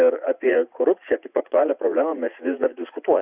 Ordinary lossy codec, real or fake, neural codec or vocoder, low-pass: Opus, 64 kbps; fake; vocoder, 44.1 kHz, 80 mel bands, Vocos; 3.6 kHz